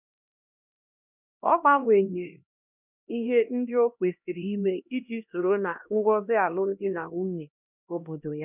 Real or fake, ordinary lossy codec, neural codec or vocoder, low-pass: fake; none; codec, 16 kHz, 1 kbps, X-Codec, HuBERT features, trained on LibriSpeech; 3.6 kHz